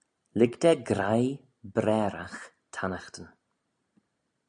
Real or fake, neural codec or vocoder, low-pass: real; none; 9.9 kHz